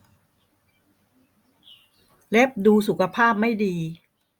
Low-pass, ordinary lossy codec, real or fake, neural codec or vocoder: 19.8 kHz; Opus, 64 kbps; real; none